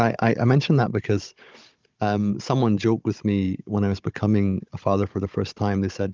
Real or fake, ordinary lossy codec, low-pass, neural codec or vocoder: fake; Opus, 24 kbps; 7.2 kHz; codec, 16 kHz, 16 kbps, FunCodec, trained on Chinese and English, 50 frames a second